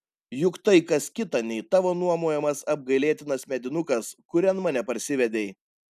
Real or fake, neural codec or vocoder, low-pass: real; none; 14.4 kHz